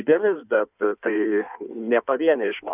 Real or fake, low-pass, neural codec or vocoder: fake; 3.6 kHz; codec, 16 kHz in and 24 kHz out, 1.1 kbps, FireRedTTS-2 codec